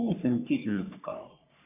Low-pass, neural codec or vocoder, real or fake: 3.6 kHz; codec, 44.1 kHz, 3.4 kbps, Pupu-Codec; fake